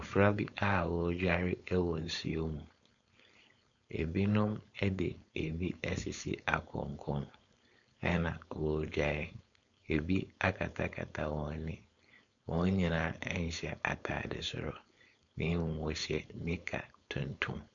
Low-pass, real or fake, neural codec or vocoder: 7.2 kHz; fake; codec, 16 kHz, 4.8 kbps, FACodec